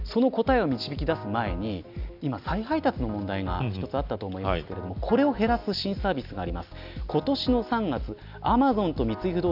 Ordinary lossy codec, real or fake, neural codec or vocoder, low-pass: none; real; none; 5.4 kHz